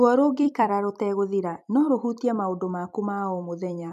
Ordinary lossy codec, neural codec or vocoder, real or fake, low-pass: none; vocoder, 44.1 kHz, 128 mel bands every 256 samples, BigVGAN v2; fake; 14.4 kHz